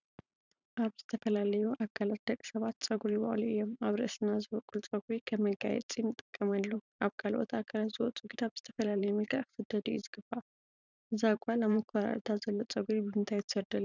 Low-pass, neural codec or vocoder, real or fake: 7.2 kHz; vocoder, 44.1 kHz, 128 mel bands every 512 samples, BigVGAN v2; fake